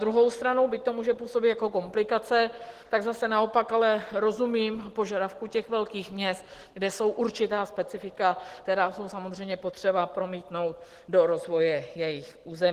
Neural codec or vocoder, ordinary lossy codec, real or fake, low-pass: none; Opus, 16 kbps; real; 14.4 kHz